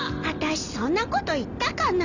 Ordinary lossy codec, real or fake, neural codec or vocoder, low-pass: none; real; none; 7.2 kHz